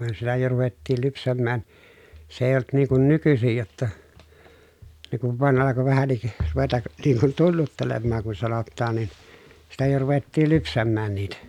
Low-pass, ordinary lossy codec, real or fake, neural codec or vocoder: 19.8 kHz; none; real; none